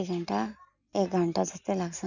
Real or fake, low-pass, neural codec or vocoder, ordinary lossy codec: real; 7.2 kHz; none; none